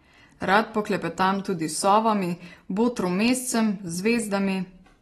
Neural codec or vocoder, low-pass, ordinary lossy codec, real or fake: none; 19.8 kHz; AAC, 32 kbps; real